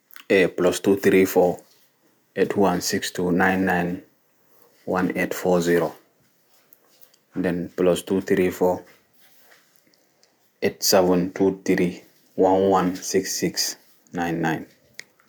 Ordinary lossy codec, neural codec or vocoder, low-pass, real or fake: none; none; none; real